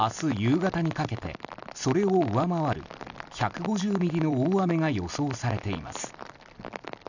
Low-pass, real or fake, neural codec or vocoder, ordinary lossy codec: 7.2 kHz; fake; vocoder, 44.1 kHz, 128 mel bands every 256 samples, BigVGAN v2; none